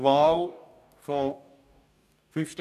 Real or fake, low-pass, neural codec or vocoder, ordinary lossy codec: fake; 14.4 kHz; codec, 44.1 kHz, 2.6 kbps, DAC; none